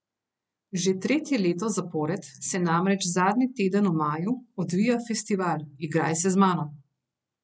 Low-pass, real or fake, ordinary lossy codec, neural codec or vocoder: none; real; none; none